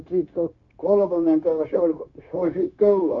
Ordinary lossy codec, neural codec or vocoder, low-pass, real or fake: MP3, 64 kbps; codec, 16 kHz, 0.9 kbps, LongCat-Audio-Codec; 7.2 kHz; fake